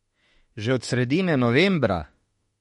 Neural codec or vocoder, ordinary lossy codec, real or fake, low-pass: autoencoder, 48 kHz, 32 numbers a frame, DAC-VAE, trained on Japanese speech; MP3, 48 kbps; fake; 19.8 kHz